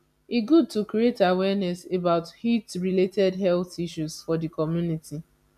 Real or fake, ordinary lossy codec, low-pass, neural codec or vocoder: real; none; 14.4 kHz; none